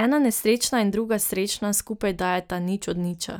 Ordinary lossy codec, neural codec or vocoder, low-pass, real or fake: none; none; none; real